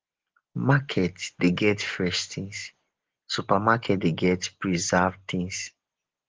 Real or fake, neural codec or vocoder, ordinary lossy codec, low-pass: real; none; Opus, 16 kbps; 7.2 kHz